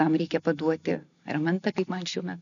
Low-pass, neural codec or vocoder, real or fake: 7.2 kHz; none; real